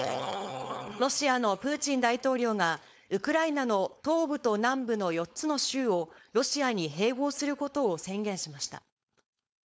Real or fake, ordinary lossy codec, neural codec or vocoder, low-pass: fake; none; codec, 16 kHz, 4.8 kbps, FACodec; none